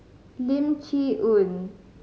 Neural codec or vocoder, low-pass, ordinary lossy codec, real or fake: none; none; none; real